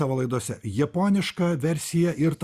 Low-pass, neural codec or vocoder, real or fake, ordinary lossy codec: 14.4 kHz; none; real; Opus, 64 kbps